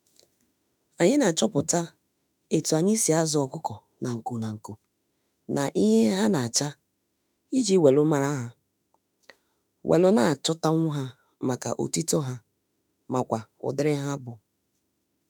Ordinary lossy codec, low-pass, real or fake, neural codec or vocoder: none; none; fake; autoencoder, 48 kHz, 32 numbers a frame, DAC-VAE, trained on Japanese speech